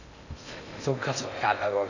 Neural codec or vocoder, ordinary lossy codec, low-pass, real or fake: codec, 16 kHz in and 24 kHz out, 0.6 kbps, FocalCodec, streaming, 2048 codes; none; 7.2 kHz; fake